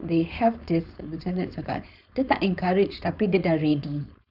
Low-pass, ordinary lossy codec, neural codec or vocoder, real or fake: 5.4 kHz; none; codec, 16 kHz, 4.8 kbps, FACodec; fake